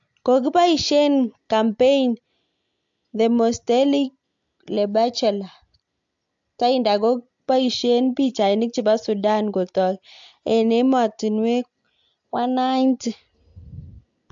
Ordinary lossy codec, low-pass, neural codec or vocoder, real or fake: AAC, 64 kbps; 7.2 kHz; none; real